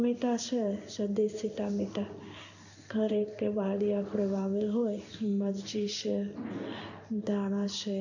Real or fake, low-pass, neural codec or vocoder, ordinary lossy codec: fake; 7.2 kHz; codec, 16 kHz in and 24 kHz out, 1 kbps, XY-Tokenizer; none